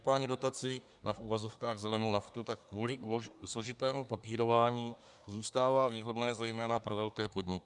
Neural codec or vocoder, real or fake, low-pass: codec, 24 kHz, 1 kbps, SNAC; fake; 10.8 kHz